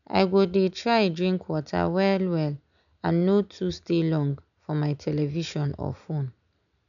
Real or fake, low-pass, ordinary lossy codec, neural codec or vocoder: real; 7.2 kHz; none; none